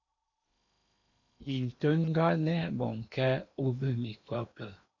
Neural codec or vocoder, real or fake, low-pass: codec, 16 kHz in and 24 kHz out, 0.8 kbps, FocalCodec, streaming, 65536 codes; fake; 7.2 kHz